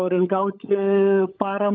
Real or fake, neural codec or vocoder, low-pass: fake; codec, 16 kHz, 16 kbps, FunCodec, trained on LibriTTS, 50 frames a second; 7.2 kHz